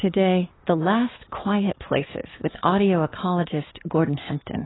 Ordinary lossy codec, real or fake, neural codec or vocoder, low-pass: AAC, 16 kbps; fake; codec, 16 kHz, 6 kbps, DAC; 7.2 kHz